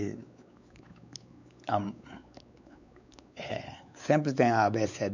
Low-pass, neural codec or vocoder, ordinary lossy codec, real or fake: 7.2 kHz; codec, 16 kHz, 4 kbps, X-Codec, WavLM features, trained on Multilingual LibriSpeech; none; fake